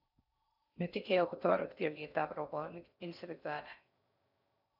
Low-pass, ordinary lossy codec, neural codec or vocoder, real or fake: 5.4 kHz; none; codec, 16 kHz in and 24 kHz out, 0.6 kbps, FocalCodec, streaming, 4096 codes; fake